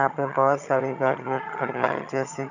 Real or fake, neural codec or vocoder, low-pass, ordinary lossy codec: fake; vocoder, 22.05 kHz, 80 mel bands, HiFi-GAN; 7.2 kHz; none